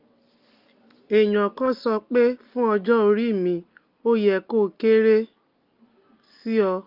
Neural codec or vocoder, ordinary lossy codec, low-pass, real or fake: none; Opus, 32 kbps; 5.4 kHz; real